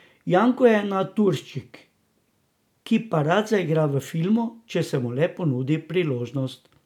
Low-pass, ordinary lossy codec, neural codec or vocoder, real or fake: 19.8 kHz; none; none; real